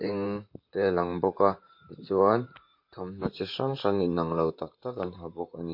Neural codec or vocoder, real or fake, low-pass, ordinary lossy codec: vocoder, 44.1 kHz, 128 mel bands, Pupu-Vocoder; fake; 5.4 kHz; MP3, 32 kbps